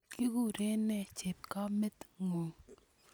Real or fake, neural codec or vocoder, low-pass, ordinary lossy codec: real; none; none; none